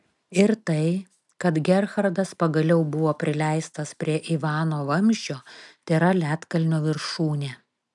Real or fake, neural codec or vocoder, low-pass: real; none; 10.8 kHz